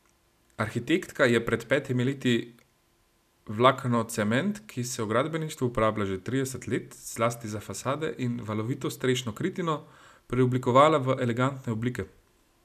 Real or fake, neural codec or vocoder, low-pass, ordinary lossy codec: real; none; 14.4 kHz; none